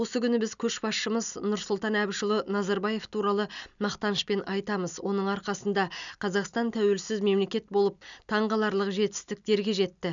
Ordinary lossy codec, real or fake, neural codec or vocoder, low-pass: none; real; none; 7.2 kHz